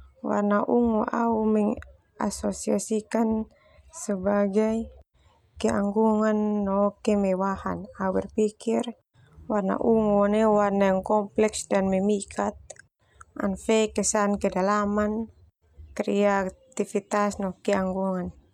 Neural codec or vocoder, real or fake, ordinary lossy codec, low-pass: none; real; none; 19.8 kHz